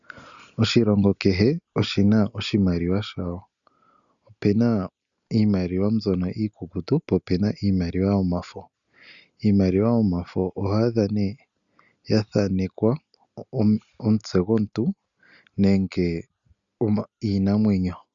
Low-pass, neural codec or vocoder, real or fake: 7.2 kHz; none; real